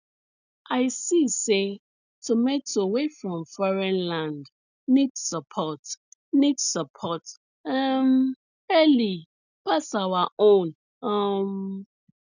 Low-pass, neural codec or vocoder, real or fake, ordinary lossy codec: 7.2 kHz; none; real; none